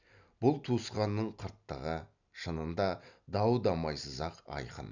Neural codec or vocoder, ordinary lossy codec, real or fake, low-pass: none; none; real; 7.2 kHz